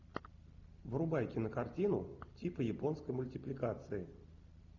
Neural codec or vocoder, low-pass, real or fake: none; 7.2 kHz; real